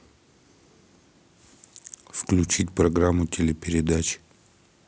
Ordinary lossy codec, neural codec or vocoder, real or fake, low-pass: none; none; real; none